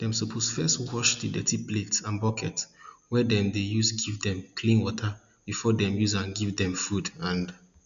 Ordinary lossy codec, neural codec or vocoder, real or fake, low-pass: none; none; real; 7.2 kHz